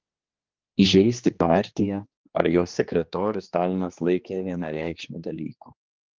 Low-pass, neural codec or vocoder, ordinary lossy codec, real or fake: 7.2 kHz; codec, 16 kHz, 2 kbps, X-Codec, HuBERT features, trained on general audio; Opus, 32 kbps; fake